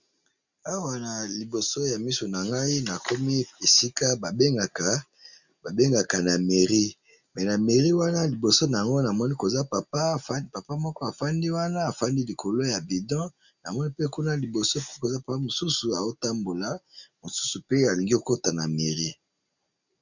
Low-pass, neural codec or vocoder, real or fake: 7.2 kHz; none; real